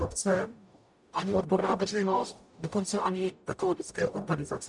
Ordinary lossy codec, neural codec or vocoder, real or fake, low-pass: MP3, 96 kbps; codec, 44.1 kHz, 0.9 kbps, DAC; fake; 10.8 kHz